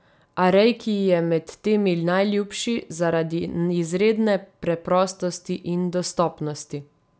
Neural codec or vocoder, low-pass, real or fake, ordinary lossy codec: none; none; real; none